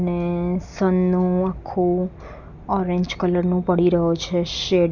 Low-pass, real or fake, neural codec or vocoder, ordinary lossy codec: 7.2 kHz; real; none; none